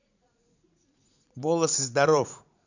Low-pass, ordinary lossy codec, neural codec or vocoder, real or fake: 7.2 kHz; none; vocoder, 44.1 kHz, 80 mel bands, Vocos; fake